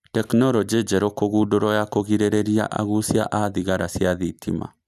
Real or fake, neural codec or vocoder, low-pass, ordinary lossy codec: real; none; 14.4 kHz; none